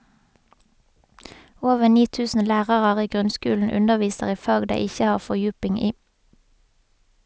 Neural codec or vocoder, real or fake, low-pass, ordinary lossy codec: none; real; none; none